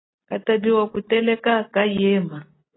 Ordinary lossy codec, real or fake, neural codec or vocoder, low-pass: AAC, 16 kbps; real; none; 7.2 kHz